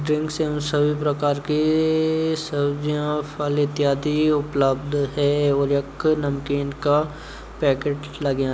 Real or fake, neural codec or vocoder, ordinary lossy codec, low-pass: real; none; none; none